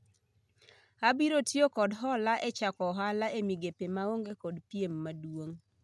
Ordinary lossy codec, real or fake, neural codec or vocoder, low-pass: none; real; none; none